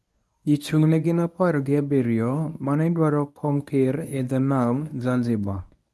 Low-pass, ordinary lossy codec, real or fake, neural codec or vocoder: none; none; fake; codec, 24 kHz, 0.9 kbps, WavTokenizer, medium speech release version 1